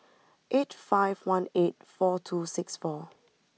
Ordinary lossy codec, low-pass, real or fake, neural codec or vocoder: none; none; real; none